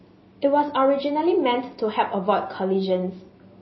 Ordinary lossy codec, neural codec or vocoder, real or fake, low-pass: MP3, 24 kbps; none; real; 7.2 kHz